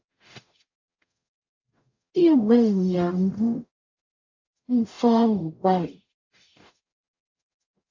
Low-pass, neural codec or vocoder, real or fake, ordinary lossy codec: 7.2 kHz; codec, 44.1 kHz, 0.9 kbps, DAC; fake; AAC, 48 kbps